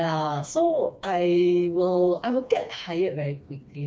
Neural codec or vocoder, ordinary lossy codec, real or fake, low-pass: codec, 16 kHz, 2 kbps, FreqCodec, smaller model; none; fake; none